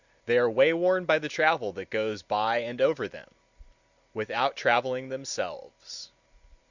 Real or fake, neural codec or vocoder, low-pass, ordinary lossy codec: real; none; 7.2 kHz; Opus, 64 kbps